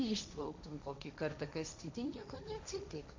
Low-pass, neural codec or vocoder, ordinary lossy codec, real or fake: 7.2 kHz; codec, 16 kHz, 1.1 kbps, Voila-Tokenizer; MP3, 64 kbps; fake